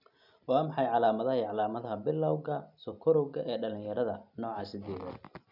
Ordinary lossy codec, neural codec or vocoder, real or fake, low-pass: none; none; real; 5.4 kHz